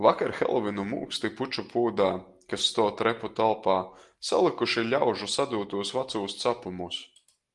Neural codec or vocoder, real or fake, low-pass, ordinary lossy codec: none; real; 10.8 kHz; Opus, 24 kbps